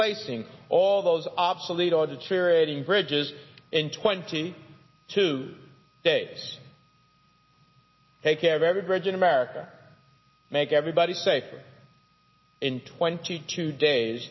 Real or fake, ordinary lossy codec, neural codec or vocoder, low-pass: real; MP3, 24 kbps; none; 7.2 kHz